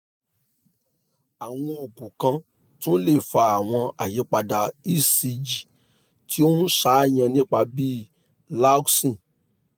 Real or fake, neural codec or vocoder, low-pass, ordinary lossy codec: real; none; none; none